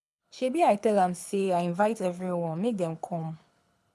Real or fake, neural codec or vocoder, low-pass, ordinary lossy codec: fake; codec, 24 kHz, 6 kbps, HILCodec; none; none